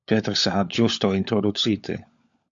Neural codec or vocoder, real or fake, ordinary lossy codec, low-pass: codec, 16 kHz, 16 kbps, FunCodec, trained on LibriTTS, 50 frames a second; fake; AAC, 64 kbps; 7.2 kHz